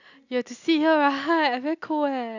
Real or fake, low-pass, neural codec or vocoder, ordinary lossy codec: real; 7.2 kHz; none; none